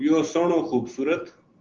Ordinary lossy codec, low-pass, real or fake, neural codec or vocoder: Opus, 16 kbps; 7.2 kHz; real; none